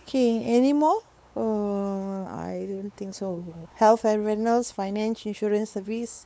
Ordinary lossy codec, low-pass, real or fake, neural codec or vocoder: none; none; fake; codec, 16 kHz, 4 kbps, X-Codec, WavLM features, trained on Multilingual LibriSpeech